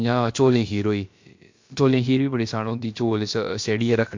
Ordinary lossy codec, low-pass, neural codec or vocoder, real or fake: MP3, 48 kbps; 7.2 kHz; codec, 16 kHz, about 1 kbps, DyCAST, with the encoder's durations; fake